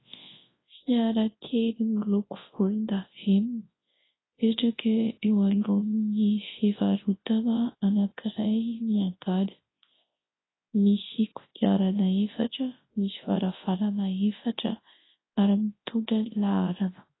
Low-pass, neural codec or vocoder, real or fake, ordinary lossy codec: 7.2 kHz; codec, 24 kHz, 0.9 kbps, WavTokenizer, large speech release; fake; AAC, 16 kbps